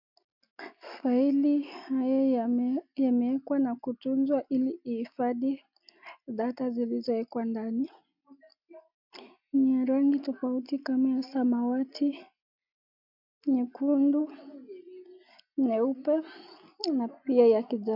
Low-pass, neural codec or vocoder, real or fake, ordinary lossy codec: 5.4 kHz; none; real; MP3, 48 kbps